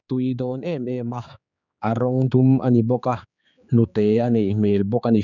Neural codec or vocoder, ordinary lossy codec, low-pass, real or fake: codec, 16 kHz, 4 kbps, X-Codec, HuBERT features, trained on general audio; none; 7.2 kHz; fake